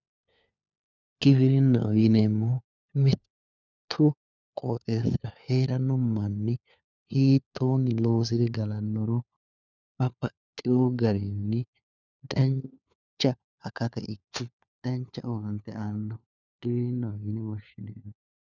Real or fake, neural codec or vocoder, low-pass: fake; codec, 16 kHz, 4 kbps, FunCodec, trained on LibriTTS, 50 frames a second; 7.2 kHz